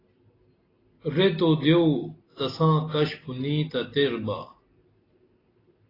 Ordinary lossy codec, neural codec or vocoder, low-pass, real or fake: AAC, 24 kbps; none; 5.4 kHz; real